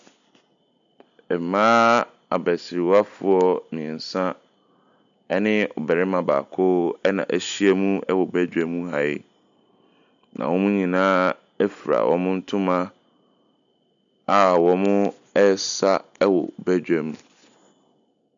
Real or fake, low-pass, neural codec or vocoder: real; 7.2 kHz; none